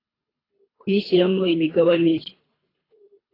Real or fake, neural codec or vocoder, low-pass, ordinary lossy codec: fake; codec, 24 kHz, 3 kbps, HILCodec; 5.4 kHz; AAC, 24 kbps